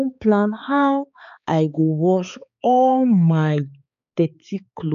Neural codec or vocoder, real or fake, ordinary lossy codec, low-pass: codec, 16 kHz, 4 kbps, X-Codec, HuBERT features, trained on balanced general audio; fake; none; 7.2 kHz